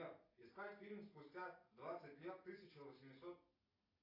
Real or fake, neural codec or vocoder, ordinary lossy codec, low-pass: real; none; AAC, 24 kbps; 5.4 kHz